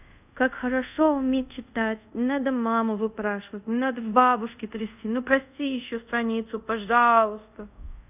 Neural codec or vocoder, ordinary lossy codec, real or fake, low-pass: codec, 24 kHz, 0.5 kbps, DualCodec; none; fake; 3.6 kHz